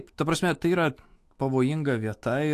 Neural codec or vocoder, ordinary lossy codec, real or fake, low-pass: autoencoder, 48 kHz, 128 numbers a frame, DAC-VAE, trained on Japanese speech; AAC, 64 kbps; fake; 14.4 kHz